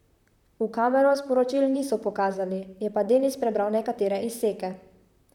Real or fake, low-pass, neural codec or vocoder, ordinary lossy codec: fake; 19.8 kHz; vocoder, 44.1 kHz, 128 mel bands, Pupu-Vocoder; none